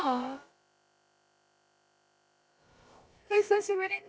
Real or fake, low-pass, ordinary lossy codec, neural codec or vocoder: fake; none; none; codec, 16 kHz, about 1 kbps, DyCAST, with the encoder's durations